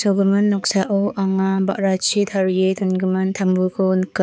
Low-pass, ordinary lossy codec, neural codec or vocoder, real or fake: none; none; codec, 16 kHz, 4 kbps, X-Codec, HuBERT features, trained on balanced general audio; fake